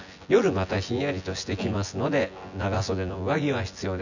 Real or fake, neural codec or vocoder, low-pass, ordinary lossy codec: fake; vocoder, 24 kHz, 100 mel bands, Vocos; 7.2 kHz; none